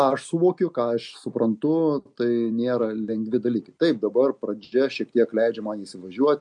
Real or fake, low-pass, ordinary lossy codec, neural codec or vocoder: real; 10.8 kHz; MP3, 48 kbps; none